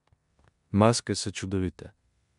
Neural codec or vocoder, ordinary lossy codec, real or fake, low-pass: codec, 16 kHz in and 24 kHz out, 0.9 kbps, LongCat-Audio-Codec, four codebook decoder; none; fake; 10.8 kHz